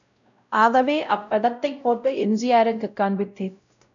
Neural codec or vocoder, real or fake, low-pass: codec, 16 kHz, 0.5 kbps, X-Codec, WavLM features, trained on Multilingual LibriSpeech; fake; 7.2 kHz